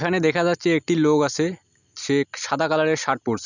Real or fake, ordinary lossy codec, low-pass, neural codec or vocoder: real; none; 7.2 kHz; none